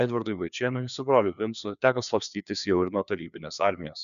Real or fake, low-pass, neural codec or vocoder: fake; 7.2 kHz; codec, 16 kHz, 2 kbps, FreqCodec, larger model